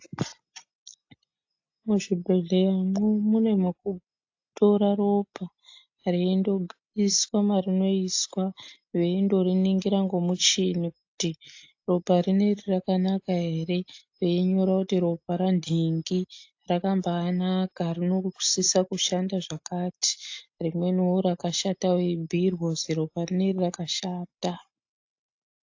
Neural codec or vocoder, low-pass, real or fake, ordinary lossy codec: none; 7.2 kHz; real; AAC, 48 kbps